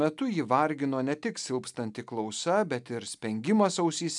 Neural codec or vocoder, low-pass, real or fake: none; 10.8 kHz; real